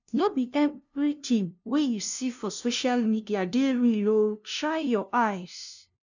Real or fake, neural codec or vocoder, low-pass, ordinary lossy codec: fake; codec, 16 kHz, 0.5 kbps, FunCodec, trained on LibriTTS, 25 frames a second; 7.2 kHz; none